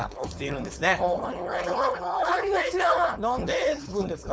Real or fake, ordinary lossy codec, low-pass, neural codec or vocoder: fake; none; none; codec, 16 kHz, 4.8 kbps, FACodec